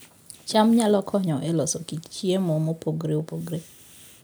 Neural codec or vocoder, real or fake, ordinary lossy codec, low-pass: none; real; none; none